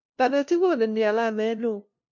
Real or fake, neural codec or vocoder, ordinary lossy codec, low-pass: fake; codec, 16 kHz, 0.5 kbps, FunCodec, trained on LibriTTS, 25 frames a second; MP3, 48 kbps; 7.2 kHz